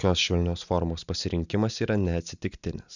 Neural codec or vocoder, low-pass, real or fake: none; 7.2 kHz; real